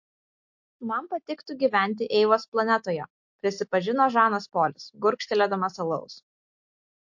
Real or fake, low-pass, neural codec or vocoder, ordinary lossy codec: real; 7.2 kHz; none; MP3, 48 kbps